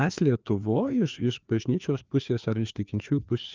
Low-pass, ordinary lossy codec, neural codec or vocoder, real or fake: 7.2 kHz; Opus, 24 kbps; codec, 16 kHz, 2 kbps, FreqCodec, larger model; fake